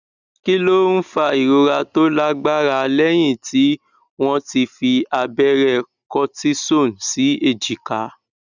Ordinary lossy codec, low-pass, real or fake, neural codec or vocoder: none; 7.2 kHz; real; none